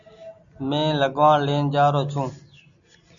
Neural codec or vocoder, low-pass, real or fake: none; 7.2 kHz; real